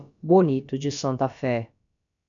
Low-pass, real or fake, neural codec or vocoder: 7.2 kHz; fake; codec, 16 kHz, about 1 kbps, DyCAST, with the encoder's durations